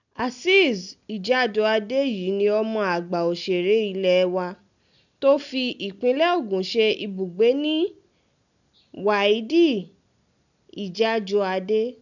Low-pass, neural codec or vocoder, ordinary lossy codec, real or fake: 7.2 kHz; none; none; real